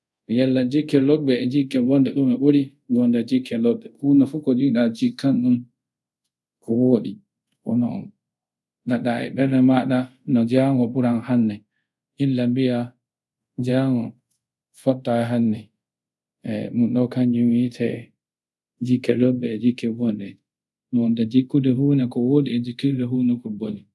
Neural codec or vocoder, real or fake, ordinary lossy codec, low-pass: codec, 24 kHz, 0.5 kbps, DualCodec; fake; none; none